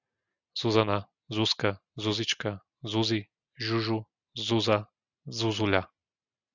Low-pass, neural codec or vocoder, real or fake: 7.2 kHz; none; real